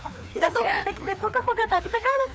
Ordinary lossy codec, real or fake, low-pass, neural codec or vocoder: none; fake; none; codec, 16 kHz, 2 kbps, FreqCodec, larger model